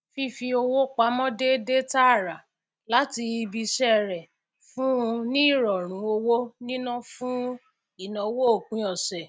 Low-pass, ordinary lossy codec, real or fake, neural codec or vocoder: none; none; real; none